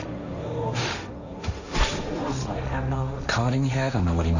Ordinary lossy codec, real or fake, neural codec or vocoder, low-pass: none; fake; codec, 16 kHz, 1.1 kbps, Voila-Tokenizer; 7.2 kHz